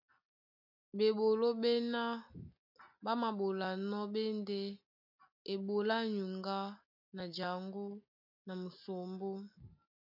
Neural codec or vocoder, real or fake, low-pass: none; real; 5.4 kHz